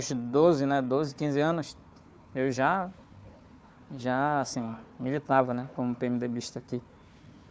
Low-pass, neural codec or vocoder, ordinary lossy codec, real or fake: none; codec, 16 kHz, 4 kbps, FunCodec, trained on Chinese and English, 50 frames a second; none; fake